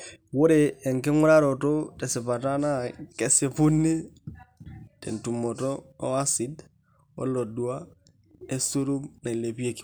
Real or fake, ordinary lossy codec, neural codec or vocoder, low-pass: real; none; none; none